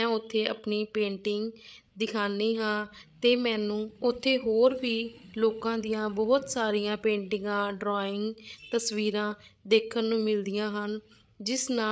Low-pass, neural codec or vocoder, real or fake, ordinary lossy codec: none; codec, 16 kHz, 8 kbps, FreqCodec, larger model; fake; none